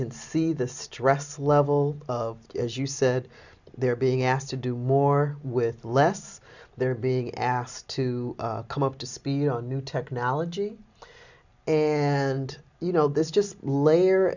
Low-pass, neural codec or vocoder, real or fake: 7.2 kHz; none; real